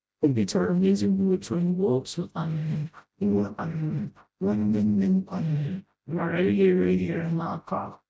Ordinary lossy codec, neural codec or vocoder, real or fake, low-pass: none; codec, 16 kHz, 0.5 kbps, FreqCodec, smaller model; fake; none